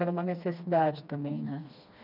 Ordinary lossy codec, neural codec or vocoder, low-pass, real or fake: none; codec, 16 kHz, 2 kbps, FreqCodec, smaller model; 5.4 kHz; fake